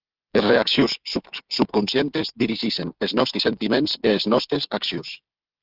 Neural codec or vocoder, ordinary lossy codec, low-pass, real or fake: codec, 16 kHz, 16 kbps, FreqCodec, smaller model; Opus, 24 kbps; 5.4 kHz; fake